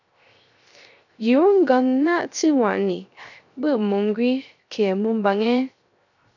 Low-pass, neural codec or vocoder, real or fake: 7.2 kHz; codec, 16 kHz, 0.3 kbps, FocalCodec; fake